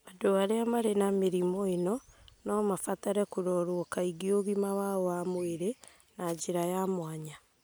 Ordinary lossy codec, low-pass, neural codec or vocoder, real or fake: none; none; none; real